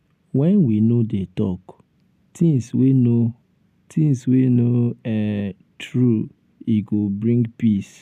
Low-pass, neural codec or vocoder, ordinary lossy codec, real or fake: 14.4 kHz; none; none; real